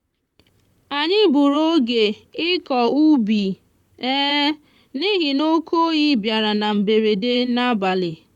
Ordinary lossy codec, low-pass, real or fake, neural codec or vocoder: none; 19.8 kHz; fake; vocoder, 44.1 kHz, 128 mel bands, Pupu-Vocoder